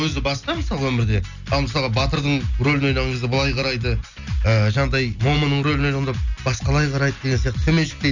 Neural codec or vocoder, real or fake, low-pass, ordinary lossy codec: none; real; 7.2 kHz; none